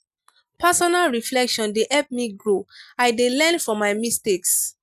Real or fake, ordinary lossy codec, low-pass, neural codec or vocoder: real; none; 14.4 kHz; none